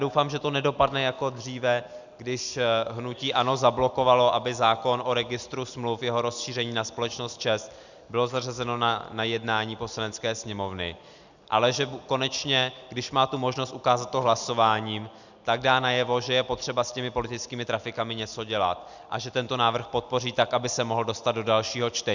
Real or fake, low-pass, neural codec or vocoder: real; 7.2 kHz; none